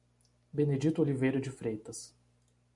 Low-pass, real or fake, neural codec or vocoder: 10.8 kHz; real; none